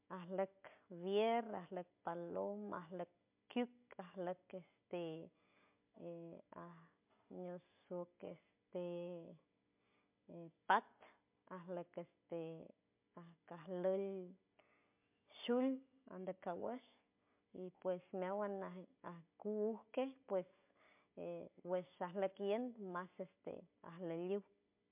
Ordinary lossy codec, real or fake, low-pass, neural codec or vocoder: MP3, 32 kbps; real; 3.6 kHz; none